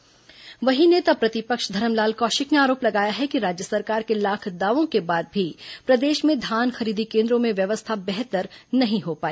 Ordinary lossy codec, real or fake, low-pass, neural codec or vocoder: none; real; none; none